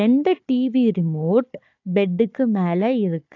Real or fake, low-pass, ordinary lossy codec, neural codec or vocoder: fake; 7.2 kHz; AAC, 48 kbps; codec, 16 kHz, 4 kbps, FunCodec, trained on Chinese and English, 50 frames a second